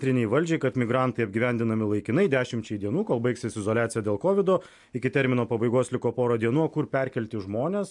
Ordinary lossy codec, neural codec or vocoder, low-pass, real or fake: MP3, 64 kbps; none; 10.8 kHz; real